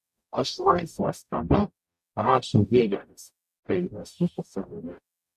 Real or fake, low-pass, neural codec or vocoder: fake; 14.4 kHz; codec, 44.1 kHz, 0.9 kbps, DAC